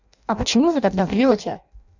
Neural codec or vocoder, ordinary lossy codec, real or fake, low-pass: codec, 16 kHz in and 24 kHz out, 0.6 kbps, FireRedTTS-2 codec; none; fake; 7.2 kHz